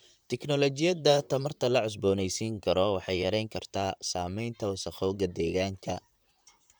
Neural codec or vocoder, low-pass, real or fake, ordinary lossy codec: vocoder, 44.1 kHz, 128 mel bands, Pupu-Vocoder; none; fake; none